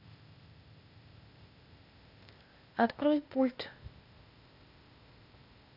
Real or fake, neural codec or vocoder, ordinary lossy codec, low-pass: fake; codec, 16 kHz, 0.8 kbps, ZipCodec; none; 5.4 kHz